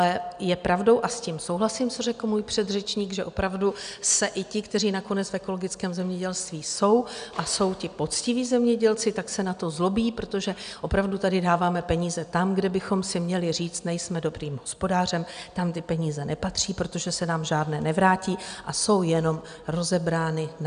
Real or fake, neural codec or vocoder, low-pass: real; none; 9.9 kHz